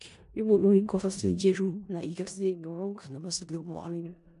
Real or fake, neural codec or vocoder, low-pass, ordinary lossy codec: fake; codec, 16 kHz in and 24 kHz out, 0.4 kbps, LongCat-Audio-Codec, four codebook decoder; 10.8 kHz; none